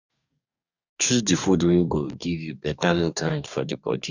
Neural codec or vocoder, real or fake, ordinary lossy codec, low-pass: codec, 44.1 kHz, 2.6 kbps, DAC; fake; none; 7.2 kHz